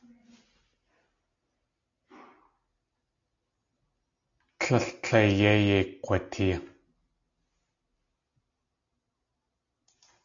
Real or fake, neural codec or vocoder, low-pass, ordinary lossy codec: real; none; 7.2 kHz; AAC, 64 kbps